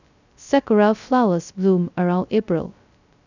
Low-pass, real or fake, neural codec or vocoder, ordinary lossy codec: 7.2 kHz; fake; codec, 16 kHz, 0.2 kbps, FocalCodec; none